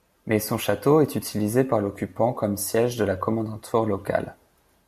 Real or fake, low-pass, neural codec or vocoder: real; 14.4 kHz; none